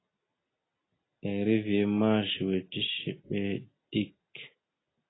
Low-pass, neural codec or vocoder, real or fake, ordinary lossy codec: 7.2 kHz; none; real; AAC, 16 kbps